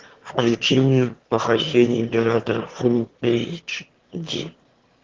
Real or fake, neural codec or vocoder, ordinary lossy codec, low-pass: fake; autoencoder, 22.05 kHz, a latent of 192 numbers a frame, VITS, trained on one speaker; Opus, 16 kbps; 7.2 kHz